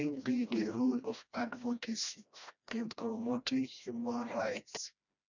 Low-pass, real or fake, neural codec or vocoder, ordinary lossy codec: 7.2 kHz; fake; codec, 16 kHz, 1 kbps, FreqCodec, smaller model; none